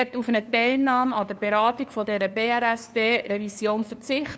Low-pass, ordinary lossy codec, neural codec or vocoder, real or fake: none; none; codec, 16 kHz, 2 kbps, FunCodec, trained on LibriTTS, 25 frames a second; fake